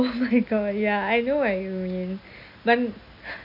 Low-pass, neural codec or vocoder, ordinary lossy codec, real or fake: 5.4 kHz; none; none; real